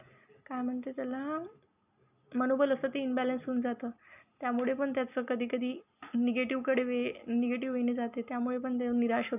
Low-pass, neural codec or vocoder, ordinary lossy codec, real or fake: 3.6 kHz; none; none; real